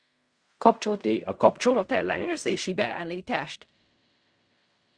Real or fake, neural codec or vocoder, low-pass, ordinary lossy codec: fake; codec, 16 kHz in and 24 kHz out, 0.4 kbps, LongCat-Audio-Codec, fine tuned four codebook decoder; 9.9 kHz; Opus, 64 kbps